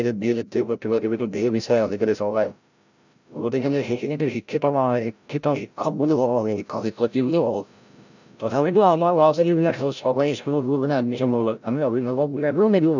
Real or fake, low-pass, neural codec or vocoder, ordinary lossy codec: fake; 7.2 kHz; codec, 16 kHz, 0.5 kbps, FreqCodec, larger model; none